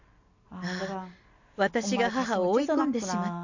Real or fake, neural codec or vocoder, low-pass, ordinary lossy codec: real; none; 7.2 kHz; none